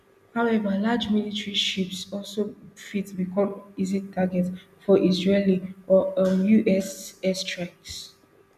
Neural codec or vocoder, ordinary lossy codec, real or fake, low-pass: none; none; real; 14.4 kHz